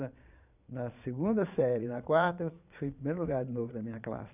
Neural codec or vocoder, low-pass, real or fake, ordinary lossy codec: vocoder, 22.05 kHz, 80 mel bands, Vocos; 3.6 kHz; fake; none